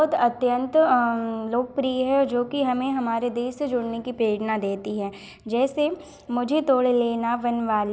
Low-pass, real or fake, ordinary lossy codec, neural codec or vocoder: none; real; none; none